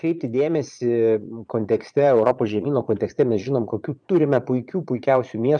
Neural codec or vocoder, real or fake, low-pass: vocoder, 22.05 kHz, 80 mel bands, Vocos; fake; 9.9 kHz